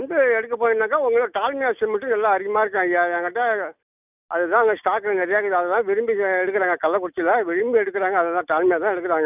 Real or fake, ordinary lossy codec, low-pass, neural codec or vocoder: real; none; 3.6 kHz; none